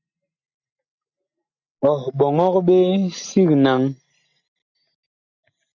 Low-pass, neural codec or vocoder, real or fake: 7.2 kHz; none; real